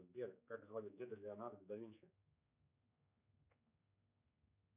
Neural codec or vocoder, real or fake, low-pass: codec, 16 kHz, 4 kbps, X-Codec, HuBERT features, trained on general audio; fake; 3.6 kHz